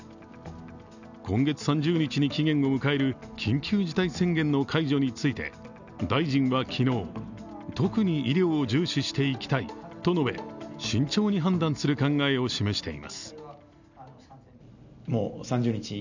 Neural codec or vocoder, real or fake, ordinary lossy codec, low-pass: none; real; none; 7.2 kHz